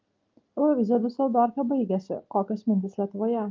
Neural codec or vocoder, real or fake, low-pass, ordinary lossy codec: none; real; 7.2 kHz; Opus, 32 kbps